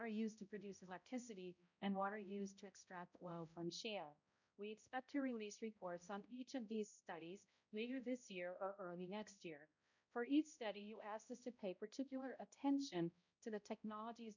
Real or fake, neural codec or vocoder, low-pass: fake; codec, 16 kHz, 0.5 kbps, X-Codec, HuBERT features, trained on balanced general audio; 7.2 kHz